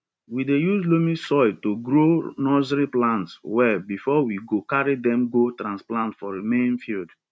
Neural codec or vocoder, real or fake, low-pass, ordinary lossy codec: none; real; none; none